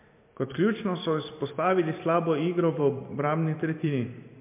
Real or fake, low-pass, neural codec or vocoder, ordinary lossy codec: fake; 3.6 kHz; vocoder, 44.1 kHz, 128 mel bands every 512 samples, BigVGAN v2; MP3, 32 kbps